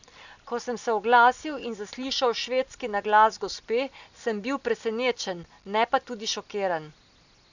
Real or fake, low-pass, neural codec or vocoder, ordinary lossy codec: real; 7.2 kHz; none; none